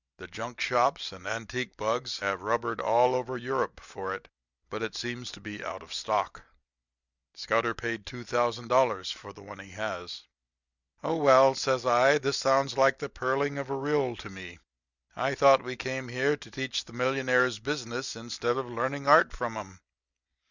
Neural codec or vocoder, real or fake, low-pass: none; real; 7.2 kHz